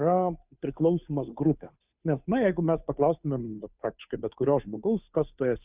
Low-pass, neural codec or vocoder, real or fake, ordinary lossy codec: 3.6 kHz; codec, 16 kHz, 2 kbps, FunCodec, trained on Chinese and English, 25 frames a second; fake; Opus, 64 kbps